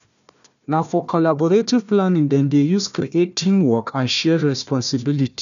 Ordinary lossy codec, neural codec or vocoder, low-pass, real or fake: none; codec, 16 kHz, 1 kbps, FunCodec, trained on Chinese and English, 50 frames a second; 7.2 kHz; fake